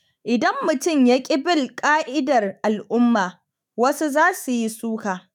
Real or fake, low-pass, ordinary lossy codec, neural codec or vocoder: fake; 19.8 kHz; none; autoencoder, 48 kHz, 128 numbers a frame, DAC-VAE, trained on Japanese speech